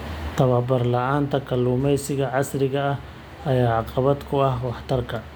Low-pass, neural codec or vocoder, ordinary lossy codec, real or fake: none; none; none; real